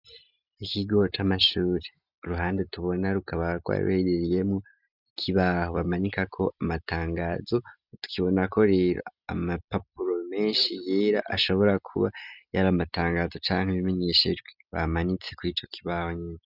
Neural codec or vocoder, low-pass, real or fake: none; 5.4 kHz; real